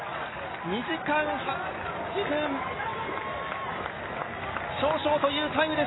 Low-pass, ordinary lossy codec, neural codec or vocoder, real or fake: 7.2 kHz; AAC, 16 kbps; none; real